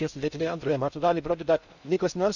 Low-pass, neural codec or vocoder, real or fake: 7.2 kHz; codec, 16 kHz in and 24 kHz out, 0.6 kbps, FocalCodec, streaming, 4096 codes; fake